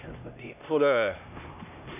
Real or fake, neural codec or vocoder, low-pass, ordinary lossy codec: fake; codec, 16 kHz, 1 kbps, X-Codec, HuBERT features, trained on LibriSpeech; 3.6 kHz; none